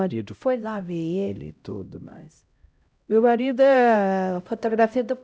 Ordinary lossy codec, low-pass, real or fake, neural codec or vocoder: none; none; fake; codec, 16 kHz, 0.5 kbps, X-Codec, HuBERT features, trained on LibriSpeech